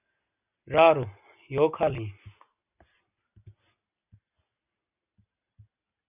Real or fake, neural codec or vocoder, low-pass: real; none; 3.6 kHz